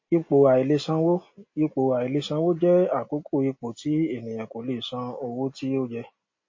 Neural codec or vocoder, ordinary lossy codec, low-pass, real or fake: none; MP3, 32 kbps; 7.2 kHz; real